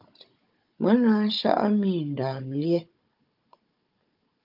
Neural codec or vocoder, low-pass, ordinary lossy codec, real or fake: codec, 16 kHz, 16 kbps, FunCodec, trained on Chinese and English, 50 frames a second; 5.4 kHz; Opus, 24 kbps; fake